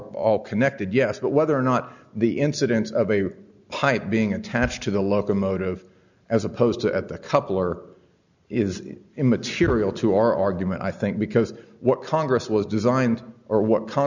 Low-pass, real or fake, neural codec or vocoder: 7.2 kHz; real; none